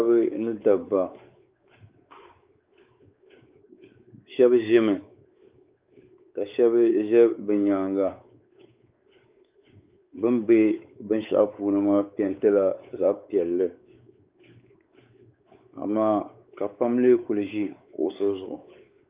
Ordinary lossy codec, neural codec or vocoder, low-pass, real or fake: Opus, 24 kbps; codec, 16 kHz, 4 kbps, X-Codec, WavLM features, trained on Multilingual LibriSpeech; 3.6 kHz; fake